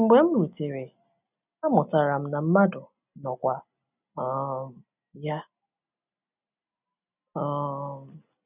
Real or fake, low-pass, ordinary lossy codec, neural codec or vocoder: real; 3.6 kHz; none; none